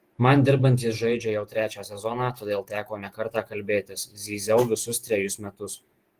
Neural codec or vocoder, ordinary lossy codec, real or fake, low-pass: vocoder, 48 kHz, 128 mel bands, Vocos; Opus, 24 kbps; fake; 14.4 kHz